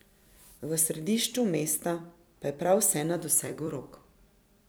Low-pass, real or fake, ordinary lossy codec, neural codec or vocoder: none; fake; none; vocoder, 44.1 kHz, 128 mel bands every 256 samples, BigVGAN v2